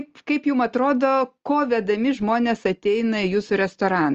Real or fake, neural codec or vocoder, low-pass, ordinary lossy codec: real; none; 7.2 kHz; Opus, 24 kbps